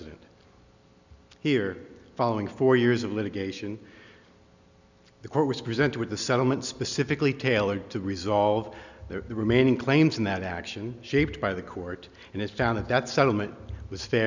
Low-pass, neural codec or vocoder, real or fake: 7.2 kHz; none; real